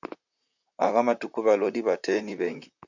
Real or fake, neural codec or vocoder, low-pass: fake; vocoder, 44.1 kHz, 128 mel bands, Pupu-Vocoder; 7.2 kHz